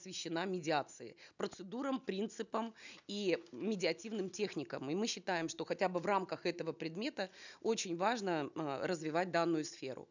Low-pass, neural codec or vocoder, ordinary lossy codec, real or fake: 7.2 kHz; none; none; real